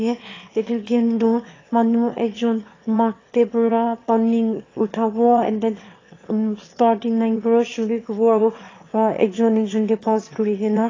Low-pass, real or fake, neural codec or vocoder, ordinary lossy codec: 7.2 kHz; fake; autoencoder, 22.05 kHz, a latent of 192 numbers a frame, VITS, trained on one speaker; AAC, 32 kbps